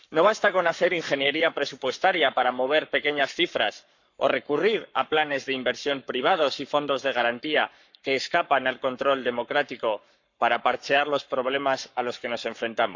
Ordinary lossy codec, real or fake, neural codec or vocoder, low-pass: none; fake; codec, 44.1 kHz, 7.8 kbps, Pupu-Codec; 7.2 kHz